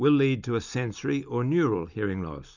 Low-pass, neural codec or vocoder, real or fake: 7.2 kHz; none; real